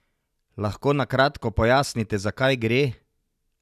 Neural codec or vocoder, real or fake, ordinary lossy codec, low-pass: none; real; none; 14.4 kHz